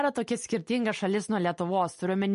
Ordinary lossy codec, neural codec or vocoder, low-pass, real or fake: MP3, 48 kbps; none; 10.8 kHz; real